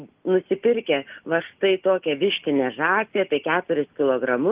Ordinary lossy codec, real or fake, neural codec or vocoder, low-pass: Opus, 24 kbps; real; none; 3.6 kHz